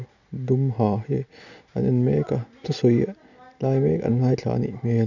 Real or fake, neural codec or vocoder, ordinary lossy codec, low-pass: real; none; none; 7.2 kHz